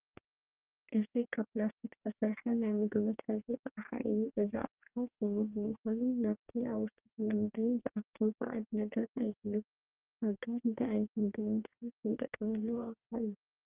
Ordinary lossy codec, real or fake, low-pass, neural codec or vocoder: Opus, 32 kbps; fake; 3.6 kHz; codec, 44.1 kHz, 2.6 kbps, DAC